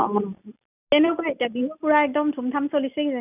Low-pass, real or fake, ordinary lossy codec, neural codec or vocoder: 3.6 kHz; real; none; none